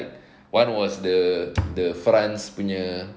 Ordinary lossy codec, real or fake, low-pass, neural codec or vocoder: none; real; none; none